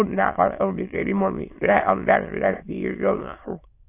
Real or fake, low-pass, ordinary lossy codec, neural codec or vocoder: fake; 3.6 kHz; AAC, 24 kbps; autoencoder, 22.05 kHz, a latent of 192 numbers a frame, VITS, trained on many speakers